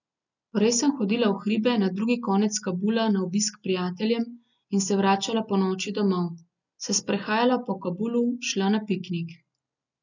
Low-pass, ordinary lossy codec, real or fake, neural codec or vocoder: 7.2 kHz; none; real; none